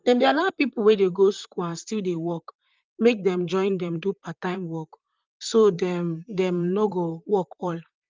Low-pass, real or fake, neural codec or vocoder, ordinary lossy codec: 7.2 kHz; fake; vocoder, 44.1 kHz, 128 mel bands, Pupu-Vocoder; Opus, 24 kbps